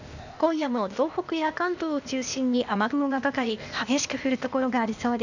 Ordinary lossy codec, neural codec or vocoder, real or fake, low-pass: none; codec, 16 kHz, 0.8 kbps, ZipCodec; fake; 7.2 kHz